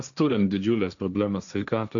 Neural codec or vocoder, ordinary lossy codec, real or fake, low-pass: codec, 16 kHz, 1.1 kbps, Voila-Tokenizer; MP3, 96 kbps; fake; 7.2 kHz